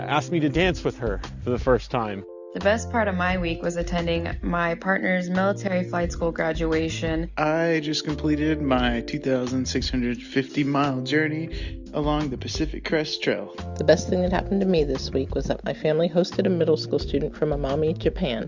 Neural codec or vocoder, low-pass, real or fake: none; 7.2 kHz; real